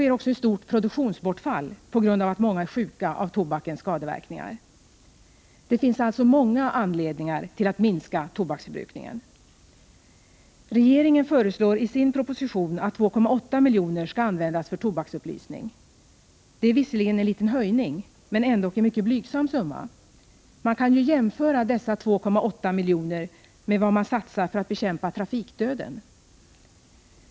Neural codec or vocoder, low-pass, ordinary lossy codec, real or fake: none; none; none; real